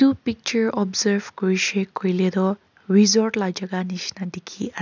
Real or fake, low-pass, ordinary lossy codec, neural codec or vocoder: real; 7.2 kHz; none; none